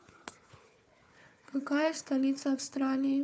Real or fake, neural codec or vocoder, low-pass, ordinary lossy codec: fake; codec, 16 kHz, 4 kbps, FunCodec, trained on Chinese and English, 50 frames a second; none; none